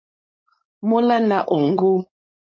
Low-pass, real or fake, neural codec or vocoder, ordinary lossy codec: 7.2 kHz; fake; codec, 16 kHz, 4.8 kbps, FACodec; MP3, 32 kbps